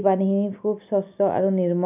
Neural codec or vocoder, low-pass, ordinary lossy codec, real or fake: none; 3.6 kHz; none; real